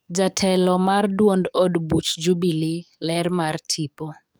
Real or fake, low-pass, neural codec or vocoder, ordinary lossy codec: fake; none; codec, 44.1 kHz, 7.8 kbps, DAC; none